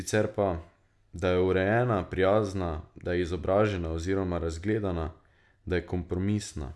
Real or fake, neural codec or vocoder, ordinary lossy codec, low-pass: real; none; none; none